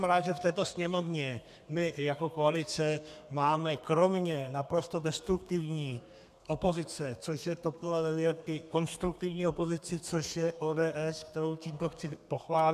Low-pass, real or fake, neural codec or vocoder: 14.4 kHz; fake; codec, 32 kHz, 1.9 kbps, SNAC